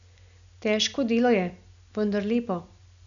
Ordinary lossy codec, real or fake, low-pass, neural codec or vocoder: none; real; 7.2 kHz; none